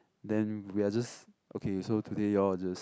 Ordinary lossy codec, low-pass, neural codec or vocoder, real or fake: none; none; none; real